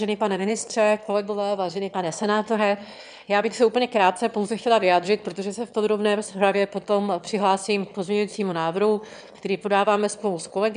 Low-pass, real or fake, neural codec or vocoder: 9.9 kHz; fake; autoencoder, 22.05 kHz, a latent of 192 numbers a frame, VITS, trained on one speaker